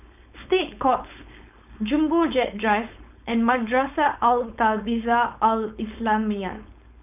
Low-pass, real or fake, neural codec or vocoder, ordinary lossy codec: 3.6 kHz; fake; codec, 16 kHz, 4.8 kbps, FACodec; none